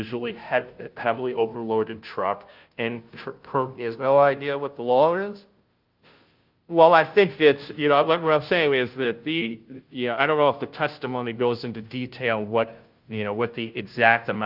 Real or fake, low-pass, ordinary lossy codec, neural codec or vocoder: fake; 5.4 kHz; Opus, 32 kbps; codec, 16 kHz, 0.5 kbps, FunCodec, trained on Chinese and English, 25 frames a second